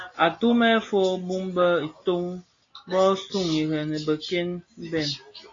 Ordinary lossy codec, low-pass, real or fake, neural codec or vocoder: AAC, 32 kbps; 7.2 kHz; real; none